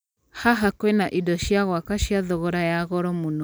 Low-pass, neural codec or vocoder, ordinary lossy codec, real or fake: none; none; none; real